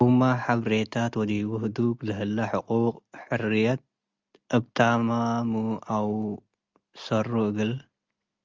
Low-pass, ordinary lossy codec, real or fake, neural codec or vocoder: 7.2 kHz; Opus, 32 kbps; real; none